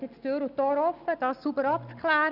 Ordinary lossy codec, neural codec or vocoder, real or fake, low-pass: none; none; real; 5.4 kHz